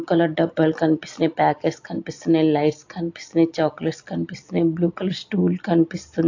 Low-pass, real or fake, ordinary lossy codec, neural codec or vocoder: 7.2 kHz; real; AAC, 48 kbps; none